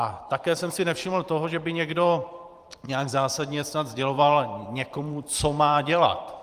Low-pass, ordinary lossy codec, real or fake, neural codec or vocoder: 14.4 kHz; Opus, 32 kbps; fake; vocoder, 44.1 kHz, 128 mel bands every 512 samples, BigVGAN v2